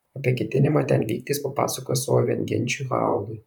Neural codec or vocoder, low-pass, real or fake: vocoder, 44.1 kHz, 128 mel bands, Pupu-Vocoder; 19.8 kHz; fake